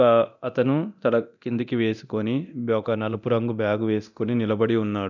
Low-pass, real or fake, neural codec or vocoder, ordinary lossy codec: 7.2 kHz; fake; codec, 24 kHz, 0.9 kbps, DualCodec; none